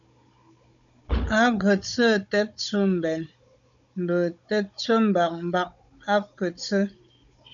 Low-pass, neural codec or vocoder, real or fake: 7.2 kHz; codec, 16 kHz, 16 kbps, FunCodec, trained on Chinese and English, 50 frames a second; fake